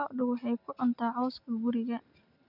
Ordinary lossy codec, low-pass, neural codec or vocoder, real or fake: none; 5.4 kHz; none; real